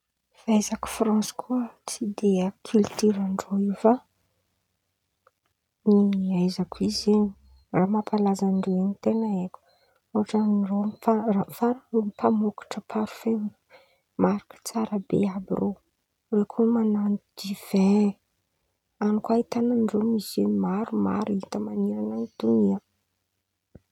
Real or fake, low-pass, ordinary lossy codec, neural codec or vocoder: real; 19.8 kHz; none; none